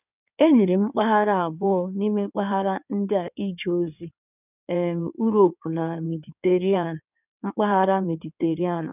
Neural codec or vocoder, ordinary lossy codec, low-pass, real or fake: codec, 16 kHz in and 24 kHz out, 2.2 kbps, FireRedTTS-2 codec; none; 3.6 kHz; fake